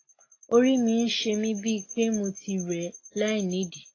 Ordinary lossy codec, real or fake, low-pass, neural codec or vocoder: AAC, 32 kbps; real; 7.2 kHz; none